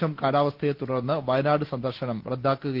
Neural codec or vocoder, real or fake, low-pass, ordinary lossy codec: none; real; 5.4 kHz; Opus, 16 kbps